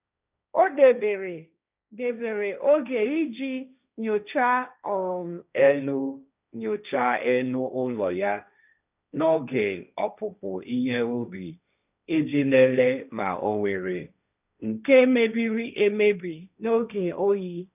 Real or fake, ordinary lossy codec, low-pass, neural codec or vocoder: fake; none; 3.6 kHz; codec, 16 kHz, 1.1 kbps, Voila-Tokenizer